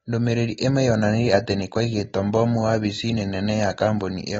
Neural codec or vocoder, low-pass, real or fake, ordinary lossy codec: none; 19.8 kHz; real; AAC, 24 kbps